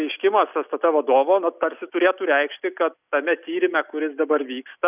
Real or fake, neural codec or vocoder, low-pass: real; none; 3.6 kHz